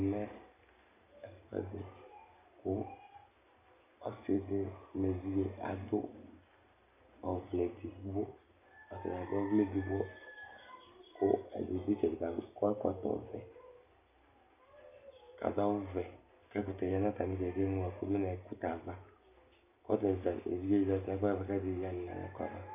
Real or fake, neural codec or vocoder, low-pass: real; none; 3.6 kHz